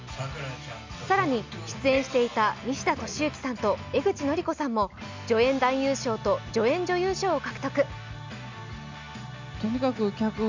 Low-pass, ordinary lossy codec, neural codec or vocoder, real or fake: 7.2 kHz; MP3, 64 kbps; none; real